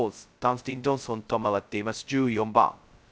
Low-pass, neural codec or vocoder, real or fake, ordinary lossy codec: none; codec, 16 kHz, 0.2 kbps, FocalCodec; fake; none